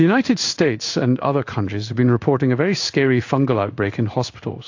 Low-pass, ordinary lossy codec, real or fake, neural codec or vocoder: 7.2 kHz; AAC, 48 kbps; fake; codec, 16 kHz in and 24 kHz out, 1 kbps, XY-Tokenizer